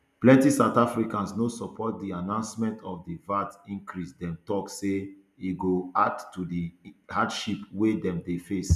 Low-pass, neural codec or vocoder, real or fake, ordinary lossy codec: 14.4 kHz; none; real; none